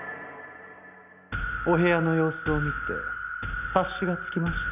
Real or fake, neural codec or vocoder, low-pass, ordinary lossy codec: real; none; 3.6 kHz; none